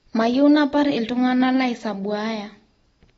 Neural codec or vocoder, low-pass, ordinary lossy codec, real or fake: none; 19.8 kHz; AAC, 24 kbps; real